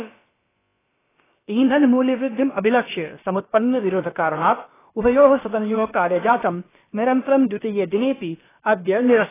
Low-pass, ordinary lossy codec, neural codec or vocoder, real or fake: 3.6 kHz; AAC, 16 kbps; codec, 16 kHz, about 1 kbps, DyCAST, with the encoder's durations; fake